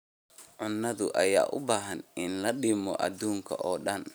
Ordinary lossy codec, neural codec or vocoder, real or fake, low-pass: none; none; real; none